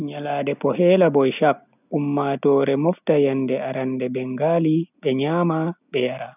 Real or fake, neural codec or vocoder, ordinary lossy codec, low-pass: real; none; none; 3.6 kHz